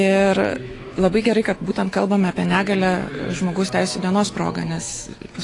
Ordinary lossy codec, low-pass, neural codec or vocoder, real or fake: AAC, 32 kbps; 10.8 kHz; autoencoder, 48 kHz, 128 numbers a frame, DAC-VAE, trained on Japanese speech; fake